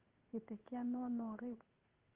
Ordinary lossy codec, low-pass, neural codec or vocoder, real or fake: Opus, 16 kbps; 3.6 kHz; codec, 16 kHz in and 24 kHz out, 1 kbps, XY-Tokenizer; fake